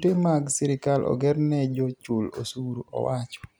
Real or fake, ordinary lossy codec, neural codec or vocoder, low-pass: real; none; none; none